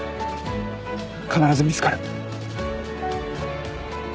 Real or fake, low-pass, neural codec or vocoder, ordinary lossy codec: real; none; none; none